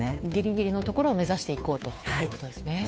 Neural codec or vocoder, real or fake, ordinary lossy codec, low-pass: codec, 16 kHz, 2 kbps, FunCodec, trained on Chinese and English, 25 frames a second; fake; none; none